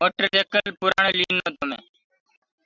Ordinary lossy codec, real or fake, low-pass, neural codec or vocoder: Opus, 64 kbps; real; 7.2 kHz; none